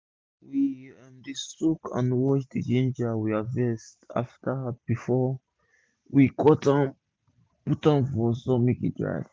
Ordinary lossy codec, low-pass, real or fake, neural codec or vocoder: none; none; real; none